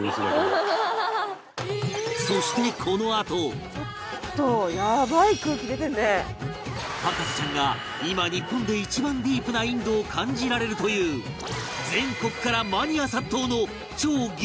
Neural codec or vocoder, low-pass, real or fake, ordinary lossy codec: none; none; real; none